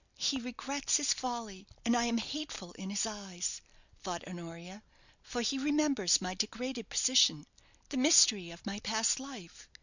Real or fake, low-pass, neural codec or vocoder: real; 7.2 kHz; none